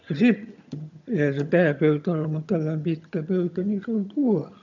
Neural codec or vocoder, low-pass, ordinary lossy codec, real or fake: vocoder, 22.05 kHz, 80 mel bands, HiFi-GAN; 7.2 kHz; none; fake